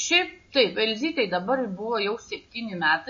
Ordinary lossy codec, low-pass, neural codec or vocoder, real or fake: MP3, 32 kbps; 7.2 kHz; none; real